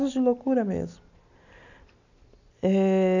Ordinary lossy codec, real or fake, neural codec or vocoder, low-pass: none; real; none; 7.2 kHz